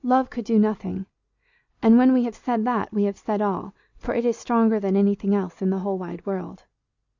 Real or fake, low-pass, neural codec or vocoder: real; 7.2 kHz; none